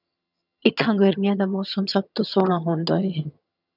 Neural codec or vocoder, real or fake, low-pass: vocoder, 22.05 kHz, 80 mel bands, HiFi-GAN; fake; 5.4 kHz